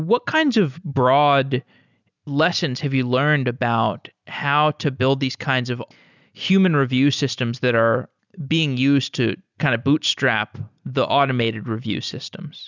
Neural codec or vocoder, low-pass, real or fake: none; 7.2 kHz; real